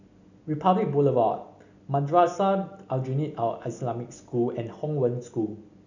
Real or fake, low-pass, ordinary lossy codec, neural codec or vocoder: real; 7.2 kHz; none; none